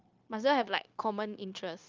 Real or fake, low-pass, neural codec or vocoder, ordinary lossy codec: fake; 7.2 kHz; codec, 16 kHz, 0.9 kbps, LongCat-Audio-Codec; Opus, 24 kbps